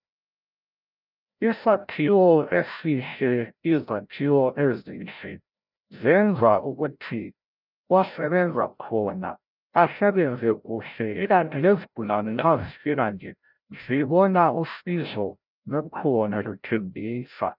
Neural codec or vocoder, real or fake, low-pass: codec, 16 kHz, 0.5 kbps, FreqCodec, larger model; fake; 5.4 kHz